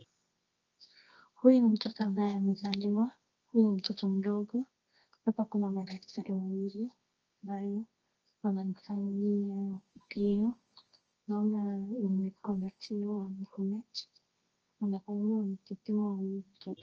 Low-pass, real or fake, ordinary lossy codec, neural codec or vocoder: 7.2 kHz; fake; Opus, 24 kbps; codec, 24 kHz, 0.9 kbps, WavTokenizer, medium music audio release